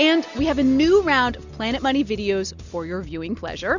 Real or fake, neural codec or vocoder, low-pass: real; none; 7.2 kHz